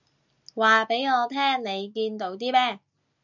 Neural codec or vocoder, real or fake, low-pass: none; real; 7.2 kHz